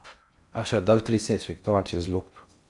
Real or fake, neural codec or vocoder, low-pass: fake; codec, 16 kHz in and 24 kHz out, 0.6 kbps, FocalCodec, streaming, 4096 codes; 10.8 kHz